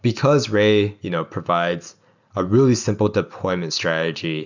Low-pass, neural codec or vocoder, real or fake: 7.2 kHz; none; real